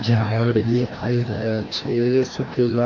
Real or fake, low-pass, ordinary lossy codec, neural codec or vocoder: fake; 7.2 kHz; AAC, 48 kbps; codec, 16 kHz, 1 kbps, FreqCodec, larger model